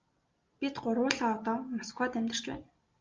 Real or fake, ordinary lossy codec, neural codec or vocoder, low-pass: real; Opus, 24 kbps; none; 7.2 kHz